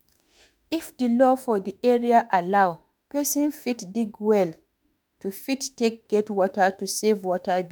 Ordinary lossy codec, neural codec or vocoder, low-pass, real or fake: none; autoencoder, 48 kHz, 32 numbers a frame, DAC-VAE, trained on Japanese speech; none; fake